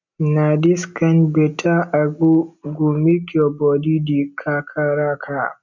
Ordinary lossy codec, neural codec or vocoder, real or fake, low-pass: none; none; real; 7.2 kHz